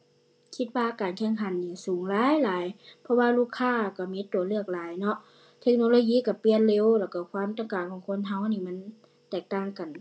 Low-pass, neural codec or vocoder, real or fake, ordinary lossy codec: none; none; real; none